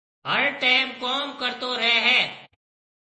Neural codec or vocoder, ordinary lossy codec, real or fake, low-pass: vocoder, 48 kHz, 128 mel bands, Vocos; MP3, 32 kbps; fake; 10.8 kHz